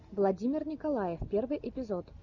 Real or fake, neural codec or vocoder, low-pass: real; none; 7.2 kHz